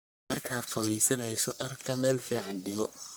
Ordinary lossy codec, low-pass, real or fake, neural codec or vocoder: none; none; fake; codec, 44.1 kHz, 1.7 kbps, Pupu-Codec